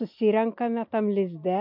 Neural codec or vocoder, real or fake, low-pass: none; real; 5.4 kHz